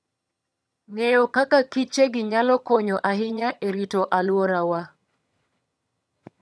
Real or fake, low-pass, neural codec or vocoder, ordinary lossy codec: fake; none; vocoder, 22.05 kHz, 80 mel bands, HiFi-GAN; none